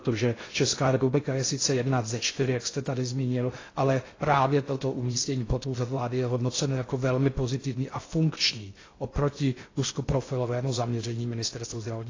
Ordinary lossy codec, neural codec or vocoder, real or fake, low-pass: AAC, 32 kbps; codec, 16 kHz in and 24 kHz out, 0.6 kbps, FocalCodec, streaming, 2048 codes; fake; 7.2 kHz